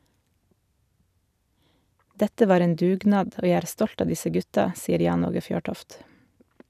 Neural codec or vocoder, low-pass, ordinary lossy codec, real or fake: none; 14.4 kHz; none; real